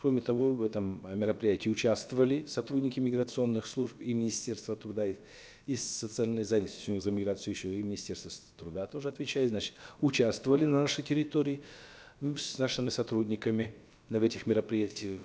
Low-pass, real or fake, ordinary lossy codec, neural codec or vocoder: none; fake; none; codec, 16 kHz, about 1 kbps, DyCAST, with the encoder's durations